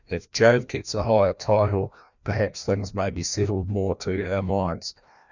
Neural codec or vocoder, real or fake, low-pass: codec, 16 kHz, 1 kbps, FreqCodec, larger model; fake; 7.2 kHz